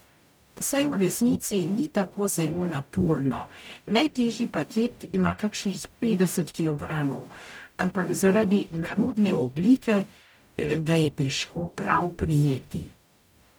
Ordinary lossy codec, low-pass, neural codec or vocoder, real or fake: none; none; codec, 44.1 kHz, 0.9 kbps, DAC; fake